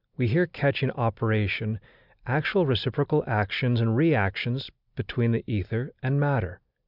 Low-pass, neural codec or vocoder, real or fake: 5.4 kHz; none; real